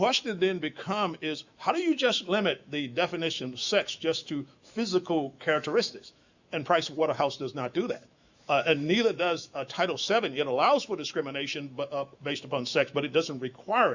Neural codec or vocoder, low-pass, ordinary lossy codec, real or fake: autoencoder, 48 kHz, 128 numbers a frame, DAC-VAE, trained on Japanese speech; 7.2 kHz; Opus, 64 kbps; fake